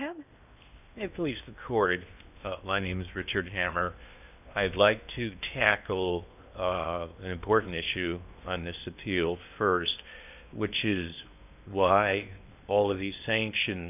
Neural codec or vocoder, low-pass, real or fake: codec, 16 kHz in and 24 kHz out, 0.8 kbps, FocalCodec, streaming, 65536 codes; 3.6 kHz; fake